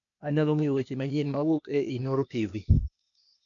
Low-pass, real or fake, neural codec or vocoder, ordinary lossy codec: 7.2 kHz; fake; codec, 16 kHz, 0.8 kbps, ZipCodec; none